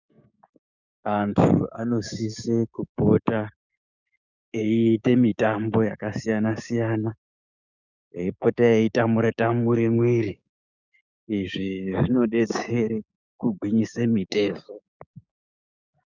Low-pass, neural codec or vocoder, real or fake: 7.2 kHz; codec, 16 kHz, 6 kbps, DAC; fake